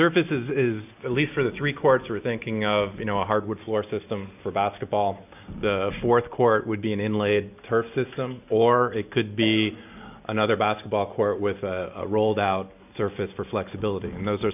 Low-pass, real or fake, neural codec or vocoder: 3.6 kHz; real; none